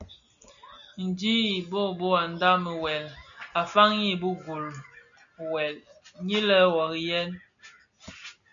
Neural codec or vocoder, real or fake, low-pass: none; real; 7.2 kHz